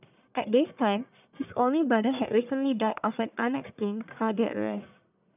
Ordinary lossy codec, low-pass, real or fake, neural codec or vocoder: none; 3.6 kHz; fake; codec, 44.1 kHz, 1.7 kbps, Pupu-Codec